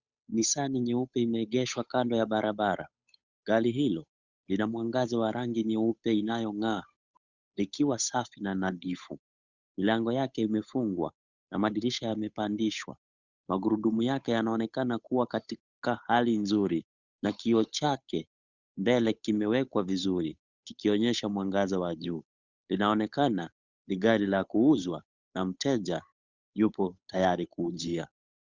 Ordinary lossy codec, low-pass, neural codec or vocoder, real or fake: Opus, 64 kbps; 7.2 kHz; codec, 16 kHz, 8 kbps, FunCodec, trained on Chinese and English, 25 frames a second; fake